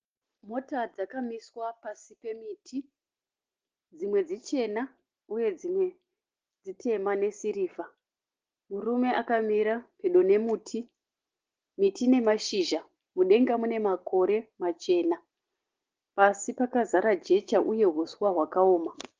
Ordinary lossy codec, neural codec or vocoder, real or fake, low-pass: Opus, 16 kbps; none; real; 7.2 kHz